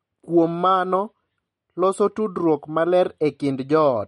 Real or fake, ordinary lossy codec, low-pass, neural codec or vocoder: real; MP3, 48 kbps; 19.8 kHz; none